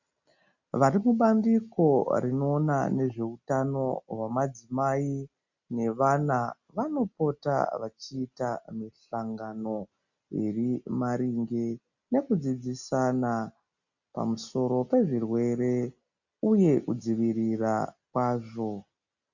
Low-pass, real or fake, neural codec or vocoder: 7.2 kHz; real; none